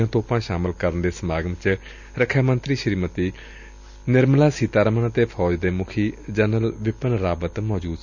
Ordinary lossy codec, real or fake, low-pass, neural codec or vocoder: none; real; 7.2 kHz; none